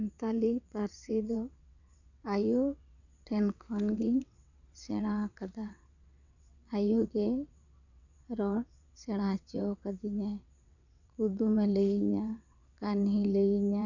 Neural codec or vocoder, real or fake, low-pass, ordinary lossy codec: vocoder, 22.05 kHz, 80 mel bands, WaveNeXt; fake; 7.2 kHz; none